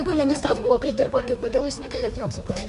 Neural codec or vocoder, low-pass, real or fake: codec, 24 kHz, 1 kbps, SNAC; 10.8 kHz; fake